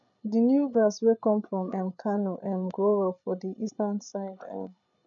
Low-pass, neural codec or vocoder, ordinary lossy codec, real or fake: 7.2 kHz; codec, 16 kHz, 8 kbps, FreqCodec, larger model; none; fake